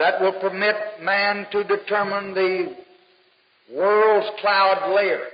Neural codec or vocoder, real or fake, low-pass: none; real; 5.4 kHz